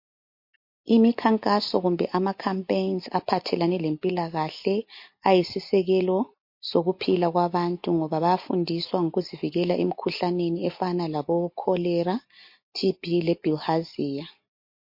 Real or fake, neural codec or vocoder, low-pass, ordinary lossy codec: real; none; 5.4 kHz; MP3, 32 kbps